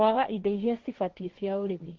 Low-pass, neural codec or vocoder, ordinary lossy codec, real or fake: 7.2 kHz; codec, 16 kHz, 1.1 kbps, Voila-Tokenizer; Opus, 16 kbps; fake